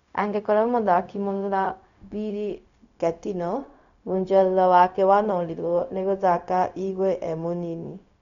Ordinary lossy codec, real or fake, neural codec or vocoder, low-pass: none; fake; codec, 16 kHz, 0.4 kbps, LongCat-Audio-Codec; 7.2 kHz